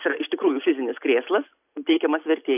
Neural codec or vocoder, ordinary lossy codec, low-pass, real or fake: none; AAC, 32 kbps; 3.6 kHz; real